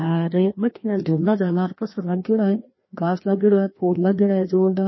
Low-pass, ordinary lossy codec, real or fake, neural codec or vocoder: 7.2 kHz; MP3, 24 kbps; fake; codec, 16 kHz, 1 kbps, FreqCodec, larger model